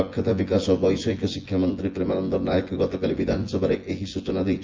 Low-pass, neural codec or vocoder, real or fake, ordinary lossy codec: 7.2 kHz; vocoder, 24 kHz, 100 mel bands, Vocos; fake; Opus, 32 kbps